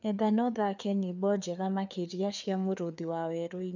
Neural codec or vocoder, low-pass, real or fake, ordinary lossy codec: codec, 16 kHz, 4 kbps, FreqCodec, larger model; 7.2 kHz; fake; none